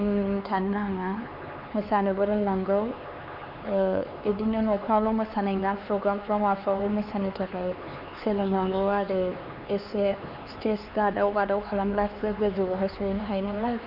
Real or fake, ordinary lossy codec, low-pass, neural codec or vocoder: fake; none; 5.4 kHz; codec, 16 kHz, 4 kbps, X-Codec, HuBERT features, trained on LibriSpeech